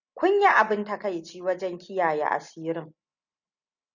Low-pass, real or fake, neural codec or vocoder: 7.2 kHz; real; none